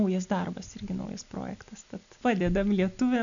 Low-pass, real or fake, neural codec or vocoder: 7.2 kHz; real; none